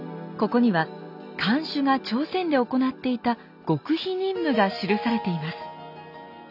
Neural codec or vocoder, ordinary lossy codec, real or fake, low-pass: none; none; real; 5.4 kHz